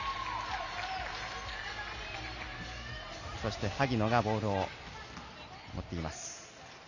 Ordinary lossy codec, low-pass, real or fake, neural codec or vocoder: none; 7.2 kHz; real; none